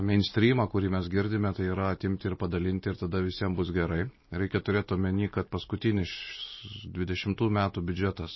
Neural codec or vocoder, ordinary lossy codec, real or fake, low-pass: none; MP3, 24 kbps; real; 7.2 kHz